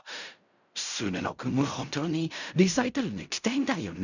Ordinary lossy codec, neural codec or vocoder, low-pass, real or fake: none; codec, 16 kHz in and 24 kHz out, 0.4 kbps, LongCat-Audio-Codec, fine tuned four codebook decoder; 7.2 kHz; fake